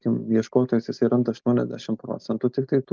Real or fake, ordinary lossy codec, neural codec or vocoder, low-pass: real; Opus, 32 kbps; none; 7.2 kHz